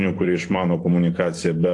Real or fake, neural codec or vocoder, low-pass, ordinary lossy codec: fake; vocoder, 44.1 kHz, 128 mel bands every 256 samples, BigVGAN v2; 10.8 kHz; AAC, 48 kbps